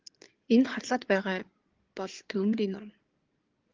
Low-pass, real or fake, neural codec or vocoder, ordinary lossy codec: 7.2 kHz; fake; codec, 16 kHz in and 24 kHz out, 2.2 kbps, FireRedTTS-2 codec; Opus, 16 kbps